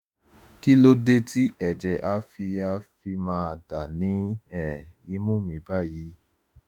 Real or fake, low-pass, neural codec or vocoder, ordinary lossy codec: fake; 19.8 kHz; autoencoder, 48 kHz, 32 numbers a frame, DAC-VAE, trained on Japanese speech; none